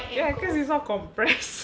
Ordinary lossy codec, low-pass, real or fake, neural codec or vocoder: none; none; real; none